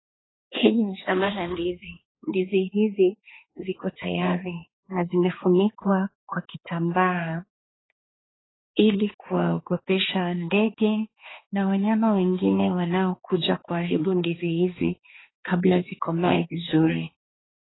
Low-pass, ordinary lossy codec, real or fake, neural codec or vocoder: 7.2 kHz; AAC, 16 kbps; fake; codec, 16 kHz, 2 kbps, X-Codec, HuBERT features, trained on balanced general audio